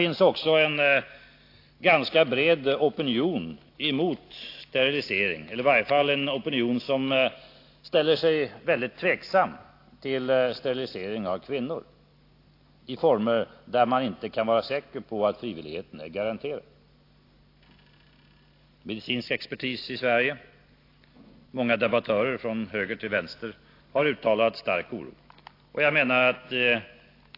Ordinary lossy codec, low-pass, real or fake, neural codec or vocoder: AAC, 32 kbps; 5.4 kHz; real; none